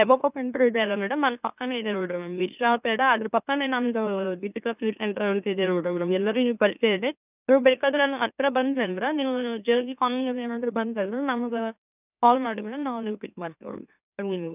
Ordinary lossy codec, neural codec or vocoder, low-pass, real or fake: none; autoencoder, 44.1 kHz, a latent of 192 numbers a frame, MeloTTS; 3.6 kHz; fake